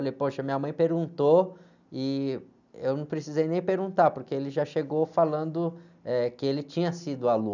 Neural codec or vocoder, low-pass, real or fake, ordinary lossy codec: none; 7.2 kHz; real; none